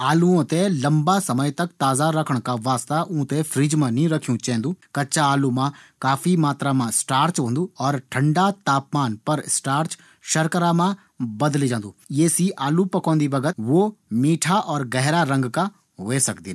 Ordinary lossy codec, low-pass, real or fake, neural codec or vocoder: none; none; real; none